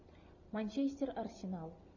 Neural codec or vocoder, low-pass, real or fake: none; 7.2 kHz; real